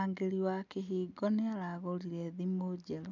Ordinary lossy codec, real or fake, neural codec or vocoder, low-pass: none; real; none; 7.2 kHz